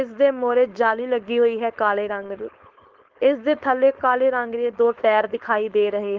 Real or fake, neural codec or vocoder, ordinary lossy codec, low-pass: fake; codec, 16 kHz, 4.8 kbps, FACodec; Opus, 16 kbps; 7.2 kHz